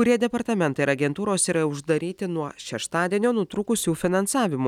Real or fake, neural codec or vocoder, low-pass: real; none; 19.8 kHz